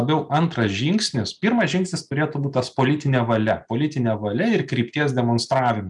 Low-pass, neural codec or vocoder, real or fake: 10.8 kHz; none; real